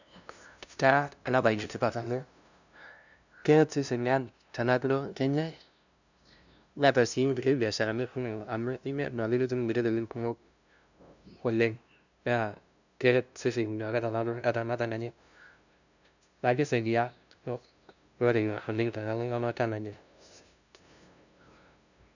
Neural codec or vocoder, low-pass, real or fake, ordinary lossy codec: codec, 16 kHz, 0.5 kbps, FunCodec, trained on LibriTTS, 25 frames a second; 7.2 kHz; fake; none